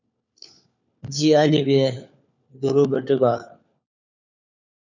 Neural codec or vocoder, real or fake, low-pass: codec, 16 kHz, 4 kbps, FunCodec, trained on LibriTTS, 50 frames a second; fake; 7.2 kHz